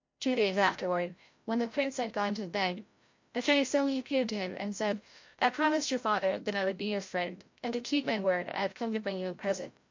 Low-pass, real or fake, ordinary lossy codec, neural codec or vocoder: 7.2 kHz; fake; MP3, 48 kbps; codec, 16 kHz, 0.5 kbps, FreqCodec, larger model